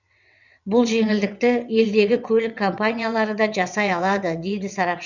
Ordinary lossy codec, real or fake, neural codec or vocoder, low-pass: none; fake; vocoder, 22.05 kHz, 80 mel bands, WaveNeXt; 7.2 kHz